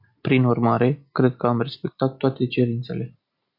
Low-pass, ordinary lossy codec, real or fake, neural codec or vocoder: 5.4 kHz; Opus, 64 kbps; real; none